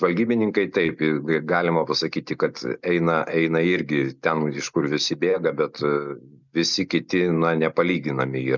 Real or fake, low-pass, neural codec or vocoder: real; 7.2 kHz; none